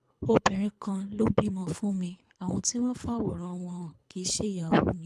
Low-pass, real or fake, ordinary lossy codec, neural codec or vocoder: none; fake; none; codec, 24 kHz, 3 kbps, HILCodec